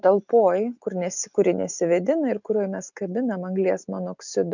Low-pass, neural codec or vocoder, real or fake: 7.2 kHz; none; real